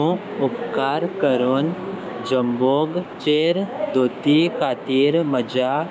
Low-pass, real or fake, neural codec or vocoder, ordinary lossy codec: none; fake; codec, 16 kHz, 6 kbps, DAC; none